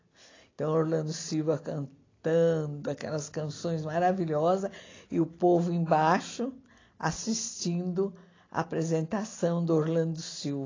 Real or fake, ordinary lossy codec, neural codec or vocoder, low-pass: real; AAC, 32 kbps; none; 7.2 kHz